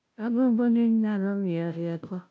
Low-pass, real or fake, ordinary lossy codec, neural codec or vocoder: none; fake; none; codec, 16 kHz, 0.5 kbps, FunCodec, trained on Chinese and English, 25 frames a second